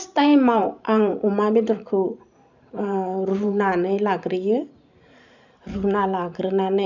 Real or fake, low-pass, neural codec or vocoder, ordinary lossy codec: fake; 7.2 kHz; vocoder, 44.1 kHz, 128 mel bands every 512 samples, BigVGAN v2; none